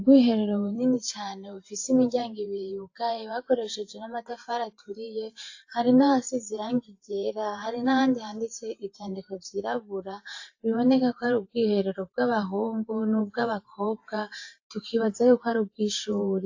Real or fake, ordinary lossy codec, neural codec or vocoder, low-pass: fake; AAC, 48 kbps; vocoder, 44.1 kHz, 128 mel bands every 512 samples, BigVGAN v2; 7.2 kHz